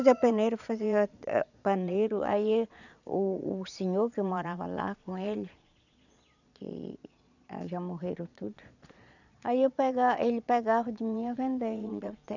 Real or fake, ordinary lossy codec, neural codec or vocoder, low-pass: fake; none; vocoder, 22.05 kHz, 80 mel bands, Vocos; 7.2 kHz